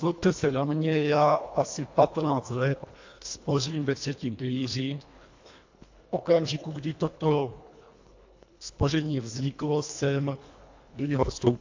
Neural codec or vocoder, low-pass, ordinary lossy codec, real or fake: codec, 24 kHz, 1.5 kbps, HILCodec; 7.2 kHz; AAC, 48 kbps; fake